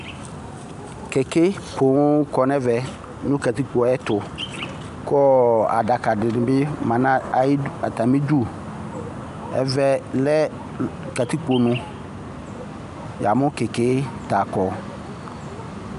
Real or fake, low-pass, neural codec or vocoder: real; 10.8 kHz; none